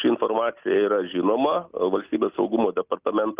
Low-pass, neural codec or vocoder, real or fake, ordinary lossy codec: 3.6 kHz; none; real; Opus, 32 kbps